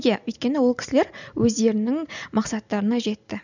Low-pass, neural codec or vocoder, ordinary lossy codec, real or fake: 7.2 kHz; none; none; real